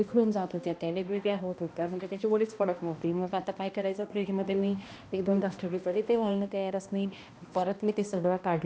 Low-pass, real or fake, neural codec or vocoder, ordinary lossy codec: none; fake; codec, 16 kHz, 1 kbps, X-Codec, HuBERT features, trained on balanced general audio; none